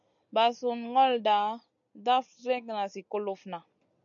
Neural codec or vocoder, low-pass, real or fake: none; 7.2 kHz; real